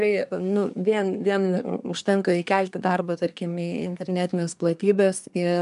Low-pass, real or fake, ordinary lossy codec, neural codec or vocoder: 10.8 kHz; fake; MP3, 96 kbps; codec, 24 kHz, 1 kbps, SNAC